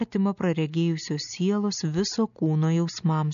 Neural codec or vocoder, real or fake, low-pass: none; real; 7.2 kHz